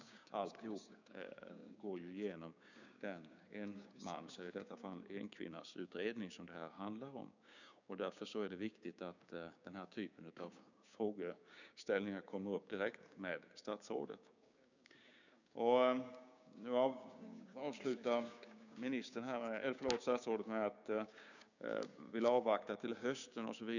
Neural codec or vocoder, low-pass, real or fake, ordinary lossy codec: codec, 16 kHz, 6 kbps, DAC; 7.2 kHz; fake; none